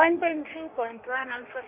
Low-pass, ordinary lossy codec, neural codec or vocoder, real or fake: 3.6 kHz; none; codec, 16 kHz in and 24 kHz out, 1.1 kbps, FireRedTTS-2 codec; fake